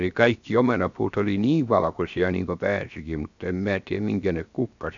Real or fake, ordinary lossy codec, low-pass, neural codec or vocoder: fake; MP3, 96 kbps; 7.2 kHz; codec, 16 kHz, 0.7 kbps, FocalCodec